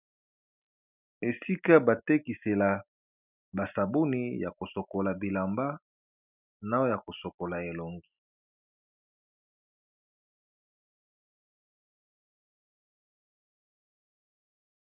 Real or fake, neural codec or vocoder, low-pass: real; none; 3.6 kHz